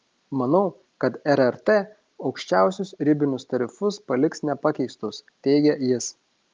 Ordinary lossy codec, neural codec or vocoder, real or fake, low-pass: Opus, 24 kbps; none; real; 7.2 kHz